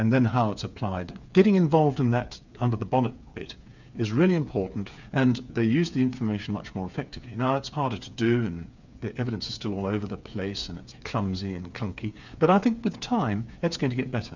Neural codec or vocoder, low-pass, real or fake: codec, 16 kHz, 8 kbps, FreqCodec, smaller model; 7.2 kHz; fake